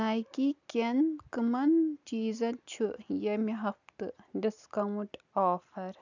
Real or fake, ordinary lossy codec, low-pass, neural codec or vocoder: real; none; 7.2 kHz; none